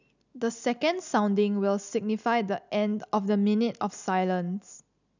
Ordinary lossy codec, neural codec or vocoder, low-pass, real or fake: none; none; 7.2 kHz; real